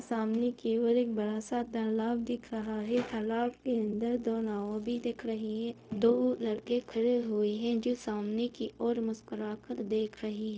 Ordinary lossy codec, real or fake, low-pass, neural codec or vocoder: none; fake; none; codec, 16 kHz, 0.4 kbps, LongCat-Audio-Codec